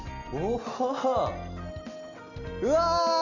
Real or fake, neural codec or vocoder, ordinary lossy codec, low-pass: real; none; none; 7.2 kHz